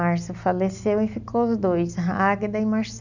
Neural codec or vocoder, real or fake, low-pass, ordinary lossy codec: none; real; 7.2 kHz; none